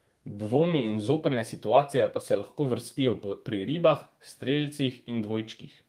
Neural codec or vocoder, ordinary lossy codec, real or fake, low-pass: codec, 32 kHz, 1.9 kbps, SNAC; Opus, 32 kbps; fake; 14.4 kHz